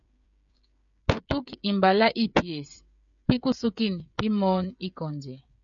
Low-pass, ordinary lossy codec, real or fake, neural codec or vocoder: 7.2 kHz; MP3, 96 kbps; fake; codec, 16 kHz, 16 kbps, FreqCodec, smaller model